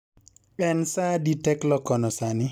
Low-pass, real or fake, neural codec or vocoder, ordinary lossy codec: none; real; none; none